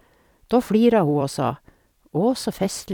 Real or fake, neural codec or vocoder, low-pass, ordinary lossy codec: fake; vocoder, 44.1 kHz, 128 mel bands every 256 samples, BigVGAN v2; 19.8 kHz; none